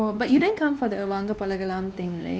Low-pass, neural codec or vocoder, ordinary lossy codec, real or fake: none; codec, 16 kHz, 2 kbps, X-Codec, WavLM features, trained on Multilingual LibriSpeech; none; fake